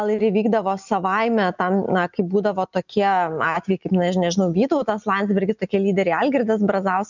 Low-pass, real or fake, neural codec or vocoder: 7.2 kHz; real; none